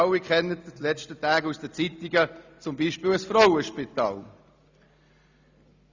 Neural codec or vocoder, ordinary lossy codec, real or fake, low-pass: none; Opus, 64 kbps; real; 7.2 kHz